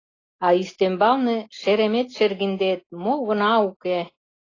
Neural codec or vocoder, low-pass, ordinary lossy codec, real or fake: none; 7.2 kHz; AAC, 32 kbps; real